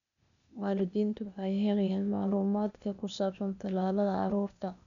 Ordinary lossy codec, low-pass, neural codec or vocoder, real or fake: none; 7.2 kHz; codec, 16 kHz, 0.8 kbps, ZipCodec; fake